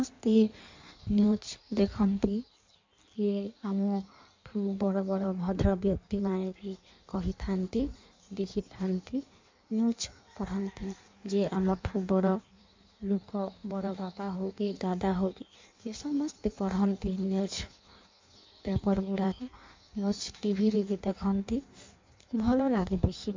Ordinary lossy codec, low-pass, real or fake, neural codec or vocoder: MP3, 64 kbps; 7.2 kHz; fake; codec, 16 kHz in and 24 kHz out, 1.1 kbps, FireRedTTS-2 codec